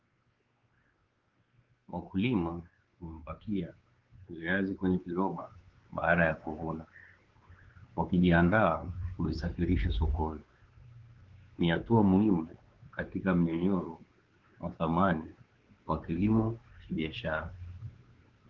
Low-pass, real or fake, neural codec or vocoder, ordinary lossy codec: 7.2 kHz; fake; codec, 16 kHz, 4 kbps, X-Codec, WavLM features, trained on Multilingual LibriSpeech; Opus, 16 kbps